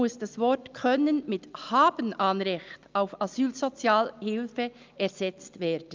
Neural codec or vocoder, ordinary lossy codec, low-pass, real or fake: none; Opus, 24 kbps; 7.2 kHz; real